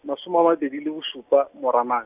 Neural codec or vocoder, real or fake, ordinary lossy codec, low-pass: none; real; none; 3.6 kHz